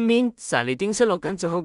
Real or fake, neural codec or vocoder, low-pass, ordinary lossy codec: fake; codec, 16 kHz in and 24 kHz out, 0.4 kbps, LongCat-Audio-Codec, two codebook decoder; 10.8 kHz; none